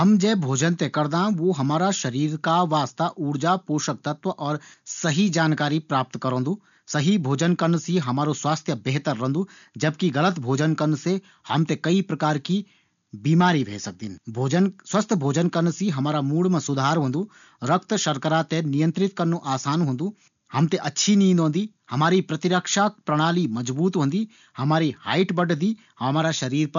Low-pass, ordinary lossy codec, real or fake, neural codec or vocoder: 7.2 kHz; none; real; none